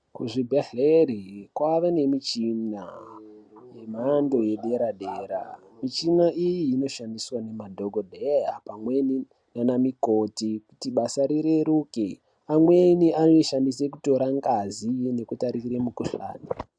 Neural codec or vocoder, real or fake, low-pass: none; real; 9.9 kHz